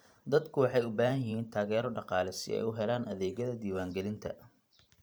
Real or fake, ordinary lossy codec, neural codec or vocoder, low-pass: fake; none; vocoder, 44.1 kHz, 128 mel bands every 512 samples, BigVGAN v2; none